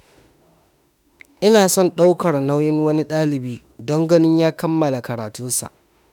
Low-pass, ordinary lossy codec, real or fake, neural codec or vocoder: 19.8 kHz; none; fake; autoencoder, 48 kHz, 32 numbers a frame, DAC-VAE, trained on Japanese speech